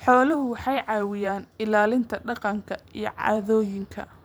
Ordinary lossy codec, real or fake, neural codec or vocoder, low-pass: none; fake; vocoder, 44.1 kHz, 128 mel bands every 512 samples, BigVGAN v2; none